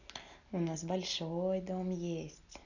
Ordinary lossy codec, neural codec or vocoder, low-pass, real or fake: none; none; 7.2 kHz; real